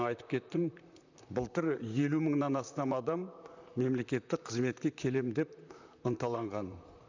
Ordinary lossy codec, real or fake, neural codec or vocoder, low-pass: none; fake; vocoder, 44.1 kHz, 128 mel bands, Pupu-Vocoder; 7.2 kHz